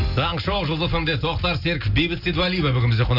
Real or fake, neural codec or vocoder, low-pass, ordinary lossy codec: real; none; 5.4 kHz; none